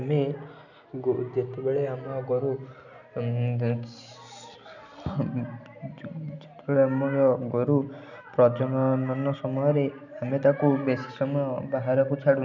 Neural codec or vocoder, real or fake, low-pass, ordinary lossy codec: none; real; 7.2 kHz; none